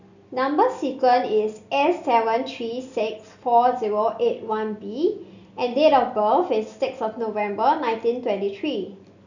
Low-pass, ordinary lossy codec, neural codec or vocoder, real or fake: 7.2 kHz; none; none; real